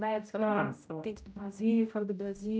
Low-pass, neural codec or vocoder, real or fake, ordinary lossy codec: none; codec, 16 kHz, 0.5 kbps, X-Codec, HuBERT features, trained on general audio; fake; none